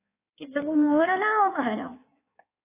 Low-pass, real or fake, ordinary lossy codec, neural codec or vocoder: 3.6 kHz; fake; AAC, 24 kbps; codec, 16 kHz in and 24 kHz out, 1.1 kbps, FireRedTTS-2 codec